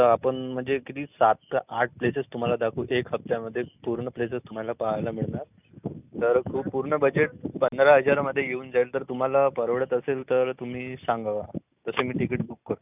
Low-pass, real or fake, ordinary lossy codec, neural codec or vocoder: 3.6 kHz; real; none; none